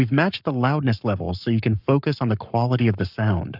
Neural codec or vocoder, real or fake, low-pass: codec, 44.1 kHz, 7.8 kbps, Pupu-Codec; fake; 5.4 kHz